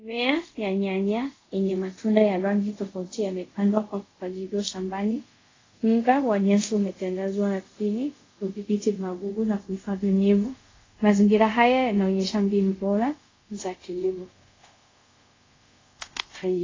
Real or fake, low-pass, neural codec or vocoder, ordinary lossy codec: fake; 7.2 kHz; codec, 24 kHz, 0.5 kbps, DualCodec; AAC, 32 kbps